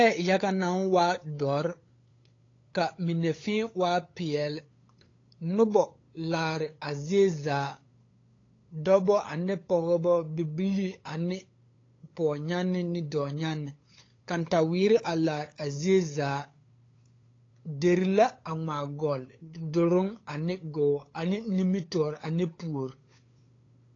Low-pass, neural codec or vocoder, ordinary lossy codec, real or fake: 7.2 kHz; codec, 16 kHz, 8 kbps, FunCodec, trained on LibriTTS, 25 frames a second; AAC, 32 kbps; fake